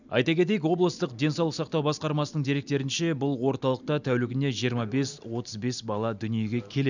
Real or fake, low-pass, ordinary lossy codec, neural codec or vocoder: real; 7.2 kHz; none; none